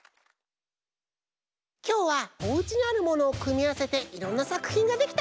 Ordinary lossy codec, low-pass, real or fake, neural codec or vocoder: none; none; real; none